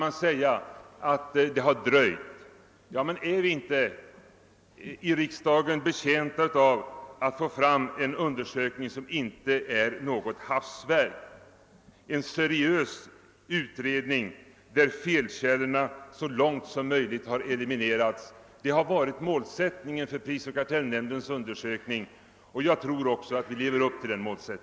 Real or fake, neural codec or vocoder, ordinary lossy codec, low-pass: real; none; none; none